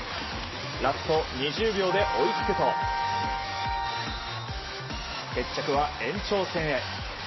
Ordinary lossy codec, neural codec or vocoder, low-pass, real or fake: MP3, 24 kbps; none; 7.2 kHz; real